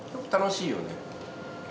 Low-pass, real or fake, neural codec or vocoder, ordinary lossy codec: none; real; none; none